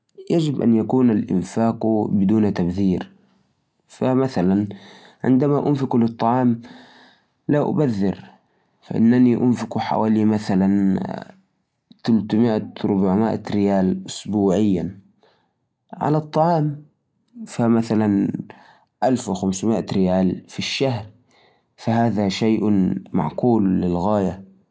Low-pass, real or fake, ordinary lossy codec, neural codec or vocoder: none; real; none; none